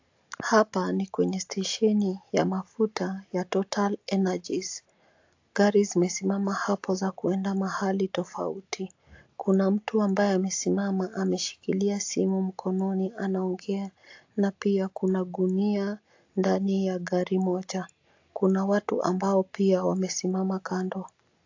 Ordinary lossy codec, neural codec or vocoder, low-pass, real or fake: AAC, 48 kbps; none; 7.2 kHz; real